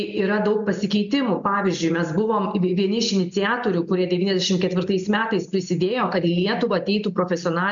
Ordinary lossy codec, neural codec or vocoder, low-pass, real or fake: MP3, 64 kbps; none; 7.2 kHz; real